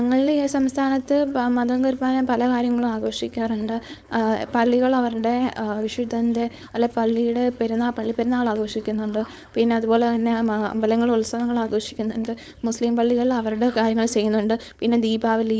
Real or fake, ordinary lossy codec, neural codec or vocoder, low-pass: fake; none; codec, 16 kHz, 4.8 kbps, FACodec; none